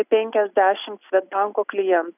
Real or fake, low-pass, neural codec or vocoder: real; 3.6 kHz; none